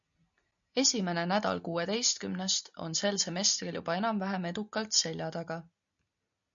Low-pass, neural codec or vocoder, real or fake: 7.2 kHz; none; real